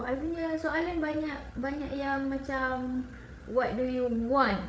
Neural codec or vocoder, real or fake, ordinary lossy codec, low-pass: codec, 16 kHz, 8 kbps, FreqCodec, larger model; fake; none; none